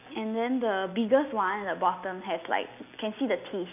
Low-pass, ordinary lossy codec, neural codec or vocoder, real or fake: 3.6 kHz; none; none; real